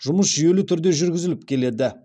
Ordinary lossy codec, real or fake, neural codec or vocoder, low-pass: none; real; none; none